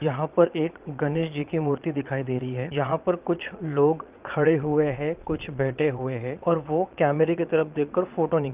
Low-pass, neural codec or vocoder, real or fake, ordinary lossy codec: 3.6 kHz; vocoder, 22.05 kHz, 80 mel bands, Vocos; fake; Opus, 32 kbps